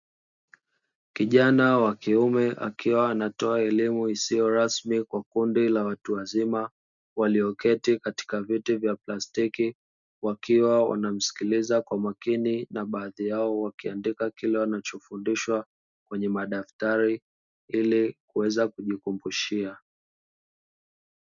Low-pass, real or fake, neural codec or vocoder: 7.2 kHz; real; none